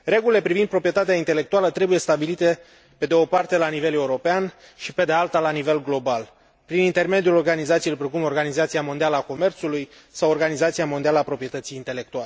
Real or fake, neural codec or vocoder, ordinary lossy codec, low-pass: real; none; none; none